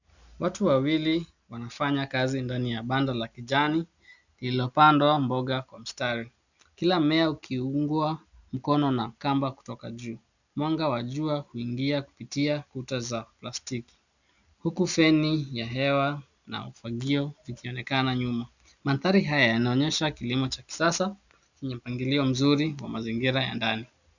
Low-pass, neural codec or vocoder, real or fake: 7.2 kHz; none; real